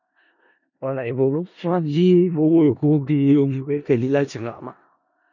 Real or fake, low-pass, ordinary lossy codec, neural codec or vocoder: fake; 7.2 kHz; none; codec, 16 kHz in and 24 kHz out, 0.4 kbps, LongCat-Audio-Codec, four codebook decoder